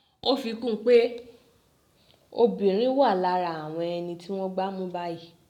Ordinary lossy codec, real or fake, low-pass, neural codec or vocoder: none; real; 19.8 kHz; none